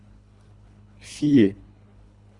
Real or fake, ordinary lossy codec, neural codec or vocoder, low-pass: fake; Opus, 64 kbps; codec, 24 kHz, 3 kbps, HILCodec; 10.8 kHz